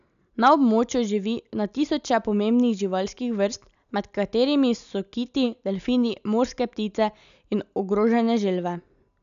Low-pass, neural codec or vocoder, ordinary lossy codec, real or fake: 7.2 kHz; none; MP3, 96 kbps; real